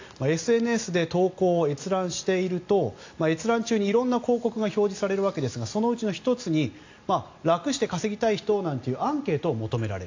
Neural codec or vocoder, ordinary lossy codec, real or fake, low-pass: none; AAC, 48 kbps; real; 7.2 kHz